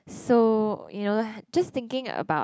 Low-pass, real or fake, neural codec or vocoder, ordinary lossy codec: none; real; none; none